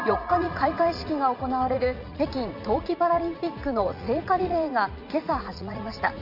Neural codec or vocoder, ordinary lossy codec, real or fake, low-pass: vocoder, 44.1 kHz, 80 mel bands, Vocos; none; fake; 5.4 kHz